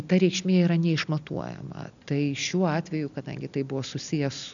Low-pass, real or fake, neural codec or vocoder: 7.2 kHz; real; none